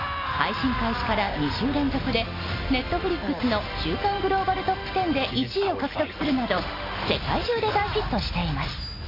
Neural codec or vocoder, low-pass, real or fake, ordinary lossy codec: none; 5.4 kHz; real; AAC, 24 kbps